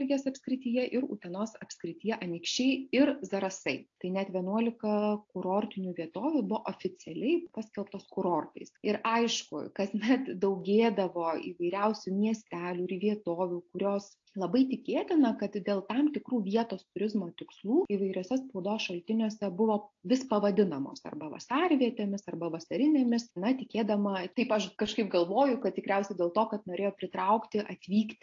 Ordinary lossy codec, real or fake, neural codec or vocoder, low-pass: AAC, 48 kbps; real; none; 7.2 kHz